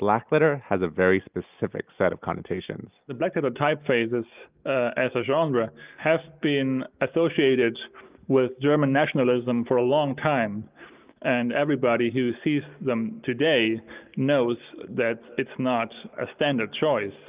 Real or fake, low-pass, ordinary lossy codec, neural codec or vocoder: real; 3.6 kHz; Opus, 24 kbps; none